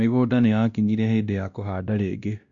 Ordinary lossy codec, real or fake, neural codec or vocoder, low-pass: Opus, 64 kbps; fake; codec, 16 kHz, 1 kbps, X-Codec, WavLM features, trained on Multilingual LibriSpeech; 7.2 kHz